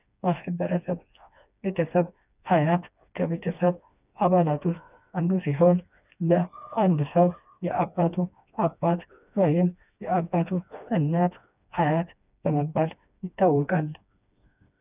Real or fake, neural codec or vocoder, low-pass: fake; codec, 16 kHz, 2 kbps, FreqCodec, smaller model; 3.6 kHz